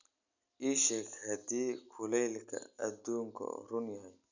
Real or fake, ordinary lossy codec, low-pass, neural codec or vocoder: real; none; 7.2 kHz; none